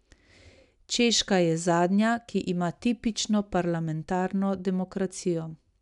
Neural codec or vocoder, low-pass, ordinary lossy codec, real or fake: none; 10.8 kHz; none; real